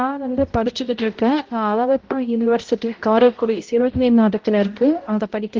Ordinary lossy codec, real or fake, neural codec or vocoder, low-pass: Opus, 16 kbps; fake; codec, 16 kHz, 0.5 kbps, X-Codec, HuBERT features, trained on balanced general audio; 7.2 kHz